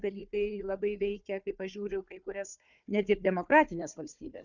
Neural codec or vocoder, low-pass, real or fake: codec, 16 kHz, 4 kbps, FunCodec, trained on Chinese and English, 50 frames a second; 7.2 kHz; fake